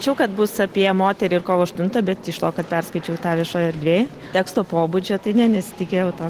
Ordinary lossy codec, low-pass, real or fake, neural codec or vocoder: Opus, 16 kbps; 14.4 kHz; real; none